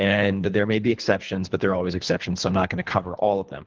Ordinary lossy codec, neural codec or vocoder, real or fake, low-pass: Opus, 16 kbps; codec, 24 kHz, 3 kbps, HILCodec; fake; 7.2 kHz